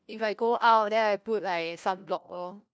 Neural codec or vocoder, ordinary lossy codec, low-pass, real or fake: codec, 16 kHz, 1 kbps, FunCodec, trained on LibriTTS, 50 frames a second; none; none; fake